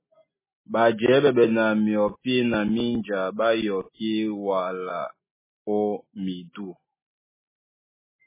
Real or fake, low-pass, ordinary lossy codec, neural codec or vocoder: real; 3.6 kHz; MP3, 16 kbps; none